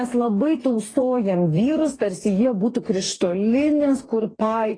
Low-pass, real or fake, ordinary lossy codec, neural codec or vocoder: 9.9 kHz; fake; AAC, 32 kbps; codec, 44.1 kHz, 2.6 kbps, DAC